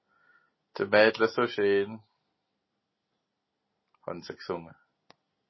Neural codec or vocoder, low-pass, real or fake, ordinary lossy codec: none; 7.2 kHz; real; MP3, 24 kbps